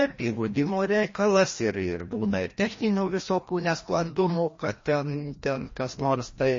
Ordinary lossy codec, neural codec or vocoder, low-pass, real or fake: MP3, 32 kbps; codec, 16 kHz, 1 kbps, FreqCodec, larger model; 7.2 kHz; fake